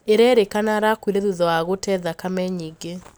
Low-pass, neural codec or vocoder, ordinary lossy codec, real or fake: none; none; none; real